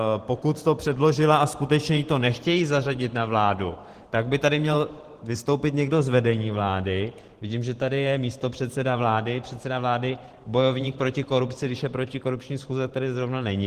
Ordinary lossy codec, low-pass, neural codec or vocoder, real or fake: Opus, 16 kbps; 14.4 kHz; vocoder, 44.1 kHz, 128 mel bands every 512 samples, BigVGAN v2; fake